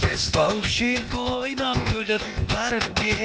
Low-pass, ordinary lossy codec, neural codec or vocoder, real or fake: none; none; codec, 16 kHz, 0.8 kbps, ZipCodec; fake